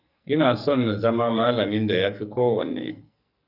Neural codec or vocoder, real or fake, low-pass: codec, 44.1 kHz, 2.6 kbps, SNAC; fake; 5.4 kHz